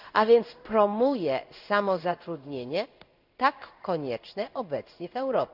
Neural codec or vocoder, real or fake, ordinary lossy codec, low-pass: codec, 16 kHz in and 24 kHz out, 1 kbps, XY-Tokenizer; fake; none; 5.4 kHz